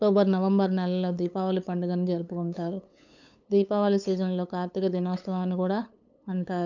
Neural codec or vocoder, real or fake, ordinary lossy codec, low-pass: codec, 16 kHz, 8 kbps, FunCodec, trained on LibriTTS, 25 frames a second; fake; none; 7.2 kHz